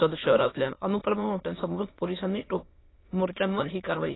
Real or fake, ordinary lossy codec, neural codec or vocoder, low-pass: fake; AAC, 16 kbps; autoencoder, 22.05 kHz, a latent of 192 numbers a frame, VITS, trained on many speakers; 7.2 kHz